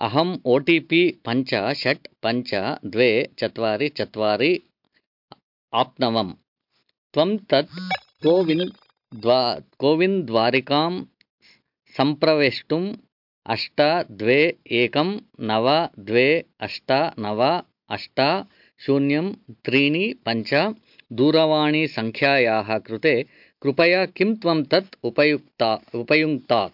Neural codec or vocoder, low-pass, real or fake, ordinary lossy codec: none; 5.4 kHz; real; MP3, 48 kbps